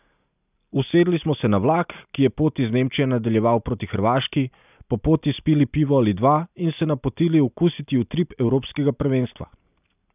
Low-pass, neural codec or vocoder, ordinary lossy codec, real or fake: 3.6 kHz; none; none; real